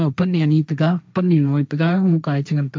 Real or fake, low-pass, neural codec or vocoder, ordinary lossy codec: fake; none; codec, 16 kHz, 1.1 kbps, Voila-Tokenizer; none